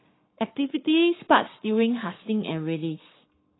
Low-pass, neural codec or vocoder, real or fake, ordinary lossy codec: 7.2 kHz; codec, 44.1 kHz, 7.8 kbps, Pupu-Codec; fake; AAC, 16 kbps